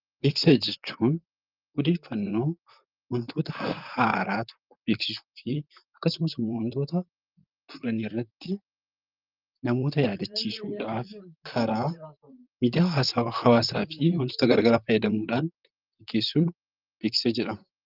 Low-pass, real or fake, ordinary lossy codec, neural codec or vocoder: 5.4 kHz; fake; Opus, 24 kbps; vocoder, 44.1 kHz, 128 mel bands, Pupu-Vocoder